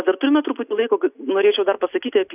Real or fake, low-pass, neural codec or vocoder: real; 3.6 kHz; none